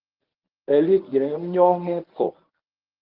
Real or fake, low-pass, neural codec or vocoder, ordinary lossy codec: fake; 5.4 kHz; codec, 24 kHz, 0.9 kbps, WavTokenizer, medium speech release version 1; Opus, 32 kbps